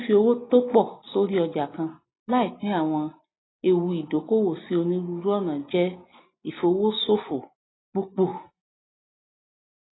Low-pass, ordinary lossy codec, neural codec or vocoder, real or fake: 7.2 kHz; AAC, 16 kbps; none; real